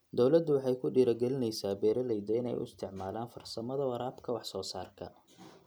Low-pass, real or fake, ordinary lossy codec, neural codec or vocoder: none; real; none; none